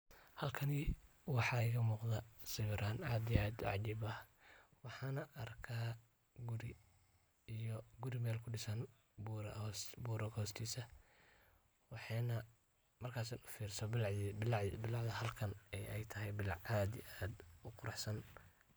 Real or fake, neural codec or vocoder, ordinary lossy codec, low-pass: real; none; none; none